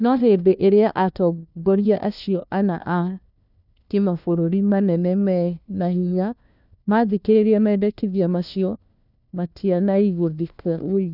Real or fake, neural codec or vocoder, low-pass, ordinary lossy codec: fake; codec, 16 kHz, 1 kbps, FunCodec, trained on LibriTTS, 50 frames a second; 5.4 kHz; none